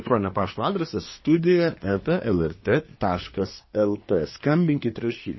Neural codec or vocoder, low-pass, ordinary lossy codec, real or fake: codec, 24 kHz, 1 kbps, SNAC; 7.2 kHz; MP3, 24 kbps; fake